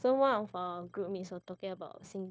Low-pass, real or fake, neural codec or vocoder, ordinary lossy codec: none; fake; codec, 16 kHz, 0.9 kbps, LongCat-Audio-Codec; none